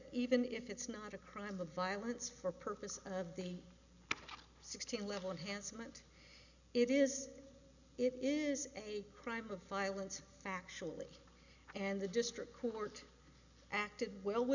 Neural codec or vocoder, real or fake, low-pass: none; real; 7.2 kHz